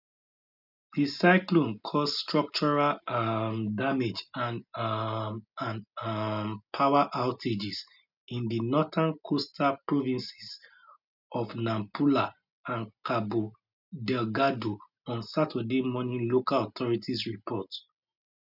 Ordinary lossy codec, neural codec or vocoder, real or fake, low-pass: none; none; real; 5.4 kHz